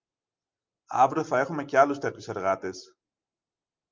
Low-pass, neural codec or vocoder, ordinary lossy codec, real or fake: 7.2 kHz; none; Opus, 24 kbps; real